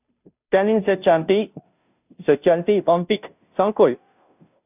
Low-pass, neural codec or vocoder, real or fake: 3.6 kHz; codec, 16 kHz, 0.5 kbps, FunCodec, trained on Chinese and English, 25 frames a second; fake